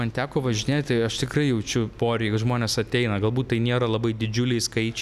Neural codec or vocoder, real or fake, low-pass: none; real; 14.4 kHz